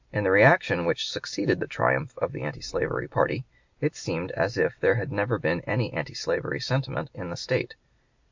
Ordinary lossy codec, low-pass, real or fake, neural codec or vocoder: MP3, 64 kbps; 7.2 kHz; real; none